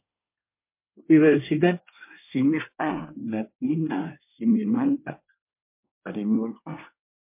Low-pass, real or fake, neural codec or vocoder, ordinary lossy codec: 3.6 kHz; fake; codec, 24 kHz, 1 kbps, SNAC; MP3, 32 kbps